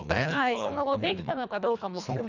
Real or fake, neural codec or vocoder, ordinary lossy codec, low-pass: fake; codec, 24 kHz, 1.5 kbps, HILCodec; none; 7.2 kHz